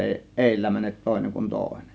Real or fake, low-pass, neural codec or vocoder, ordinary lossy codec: real; none; none; none